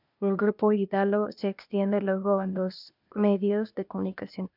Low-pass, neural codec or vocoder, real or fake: 5.4 kHz; codec, 16 kHz, 0.8 kbps, ZipCodec; fake